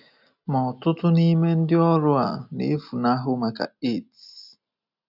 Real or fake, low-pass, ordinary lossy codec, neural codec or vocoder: real; 5.4 kHz; none; none